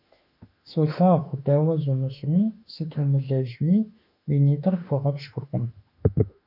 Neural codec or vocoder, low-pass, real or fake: autoencoder, 48 kHz, 32 numbers a frame, DAC-VAE, trained on Japanese speech; 5.4 kHz; fake